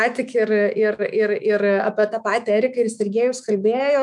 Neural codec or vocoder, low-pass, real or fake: autoencoder, 48 kHz, 128 numbers a frame, DAC-VAE, trained on Japanese speech; 10.8 kHz; fake